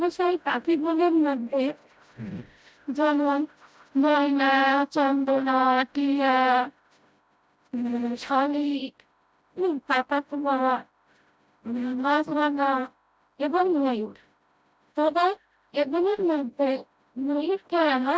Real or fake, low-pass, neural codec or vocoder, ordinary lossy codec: fake; none; codec, 16 kHz, 0.5 kbps, FreqCodec, smaller model; none